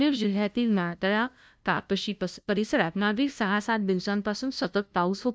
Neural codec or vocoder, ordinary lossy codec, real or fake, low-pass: codec, 16 kHz, 0.5 kbps, FunCodec, trained on LibriTTS, 25 frames a second; none; fake; none